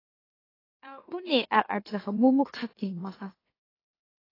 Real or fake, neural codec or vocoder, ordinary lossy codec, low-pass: fake; autoencoder, 44.1 kHz, a latent of 192 numbers a frame, MeloTTS; AAC, 24 kbps; 5.4 kHz